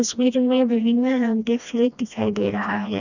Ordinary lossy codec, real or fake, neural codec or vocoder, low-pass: none; fake; codec, 16 kHz, 1 kbps, FreqCodec, smaller model; 7.2 kHz